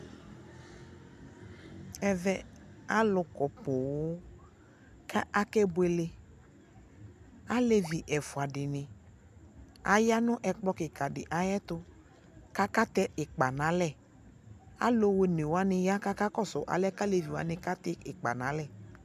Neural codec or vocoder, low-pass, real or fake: none; 14.4 kHz; real